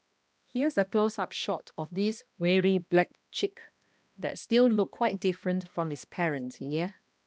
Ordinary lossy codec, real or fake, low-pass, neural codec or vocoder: none; fake; none; codec, 16 kHz, 1 kbps, X-Codec, HuBERT features, trained on balanced general audio